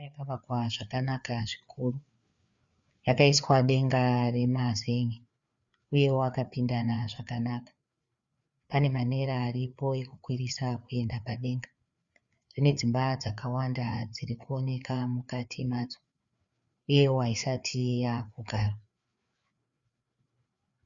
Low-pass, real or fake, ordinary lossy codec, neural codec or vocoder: 7.2 kHz; fake; Opus, 64 kbps; codec, 16 kHz, 4 kbps, FreqCodec, larger model